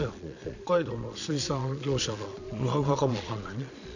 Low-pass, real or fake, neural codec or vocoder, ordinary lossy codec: 7.2 kHz; fake; vocoder, 22.05 kHz, 80 mel bands, Vocos; none